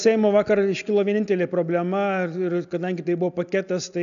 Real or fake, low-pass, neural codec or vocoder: real; 7.2 kHz; none